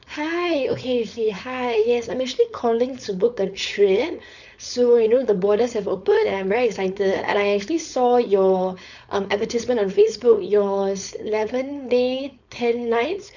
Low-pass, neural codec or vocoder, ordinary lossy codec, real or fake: 7.2 kHz; codec, 16 kHz, 4.8 kbps, FACodec; none; fake